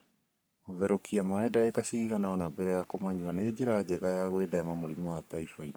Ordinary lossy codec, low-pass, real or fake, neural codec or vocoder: none; none; fake; codec, 44.1 kHz, 3.4 kbps, Pupu-Codec